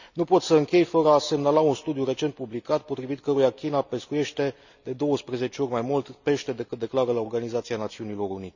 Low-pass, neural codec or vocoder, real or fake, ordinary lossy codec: 7.2 kHz; none; real; none